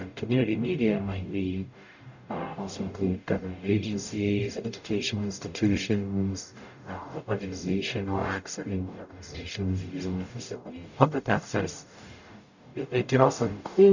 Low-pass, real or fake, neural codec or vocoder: 7.2 kHz; fake; codec, 44.1 kHz, 0.9 kbps, DAC